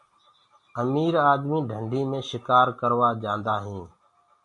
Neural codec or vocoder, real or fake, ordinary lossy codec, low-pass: none; real; MP3, 48 kbps; 10.8 kHz